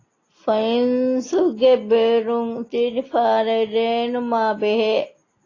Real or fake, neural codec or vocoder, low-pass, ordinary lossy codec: real; none; 7.2 kHz; AAC, 32 kbps